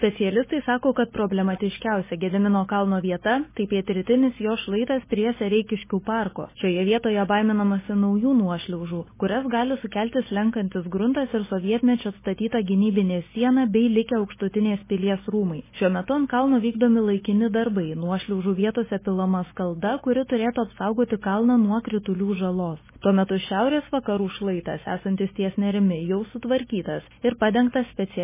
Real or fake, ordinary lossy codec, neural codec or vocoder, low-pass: real; MP3, 16 kbps; none; 3.6 kHz